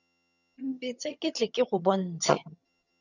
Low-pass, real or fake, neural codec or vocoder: 7.2 kHz; fake; vocoder, 22.05 kHz, 80 mel bands, HiFi-GAN